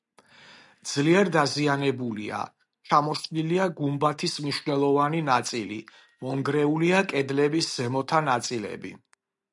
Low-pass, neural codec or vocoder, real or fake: 10.8 kHz; none; real